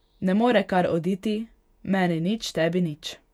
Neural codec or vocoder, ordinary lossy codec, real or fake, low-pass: vocoder, 48 kHz, 128 mel bands, Vocos; none; fake; 19.8 kHz